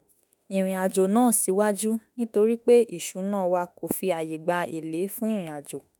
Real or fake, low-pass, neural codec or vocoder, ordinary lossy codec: fake; none; autoencoder, 48 kHz, 32 numbers a frame, DAC-VAE, trained on Japanese speech; none